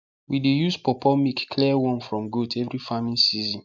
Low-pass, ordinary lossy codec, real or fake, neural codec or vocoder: 7.2 kHz; none; real; none